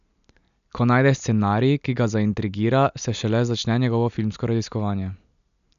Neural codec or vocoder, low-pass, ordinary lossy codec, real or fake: none; 7.2 kHz; none; real